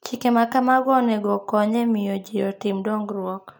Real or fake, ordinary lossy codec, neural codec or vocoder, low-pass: real; none; none; none